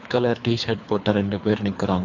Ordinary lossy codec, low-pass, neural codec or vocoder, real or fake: AAC, 48 kbps; 7.2 kHz; codec, 24 kHz, 3 kbps, HILCodec; fake